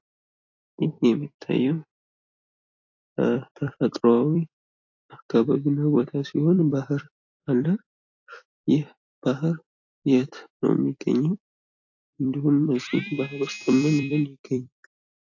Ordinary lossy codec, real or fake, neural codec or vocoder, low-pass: AAC, 48 kbps; fake; vocoder, 44.1 kHz, 128 mel bands every 256 samples, BigVGAN v2; 7.2 kHz